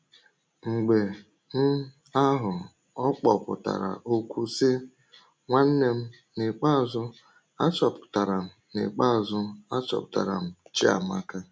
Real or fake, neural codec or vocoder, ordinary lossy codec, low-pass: real; none; none; none